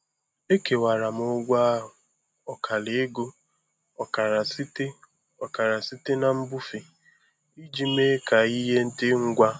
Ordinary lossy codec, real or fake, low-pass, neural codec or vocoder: none; real; none; none